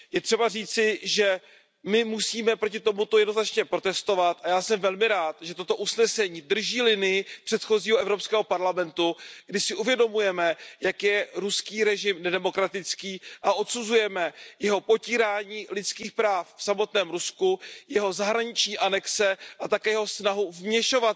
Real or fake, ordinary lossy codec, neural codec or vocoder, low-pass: real; none; none; none